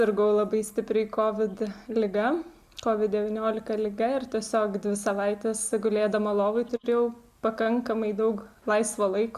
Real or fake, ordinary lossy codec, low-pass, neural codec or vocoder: real; Opus, 64 kbps; 14.4 kHz; none